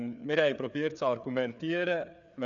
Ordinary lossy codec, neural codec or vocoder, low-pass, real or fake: none; codec, 16 kHz, 4 kbps, FreqCodec, larger model; 7.2 kHz; fake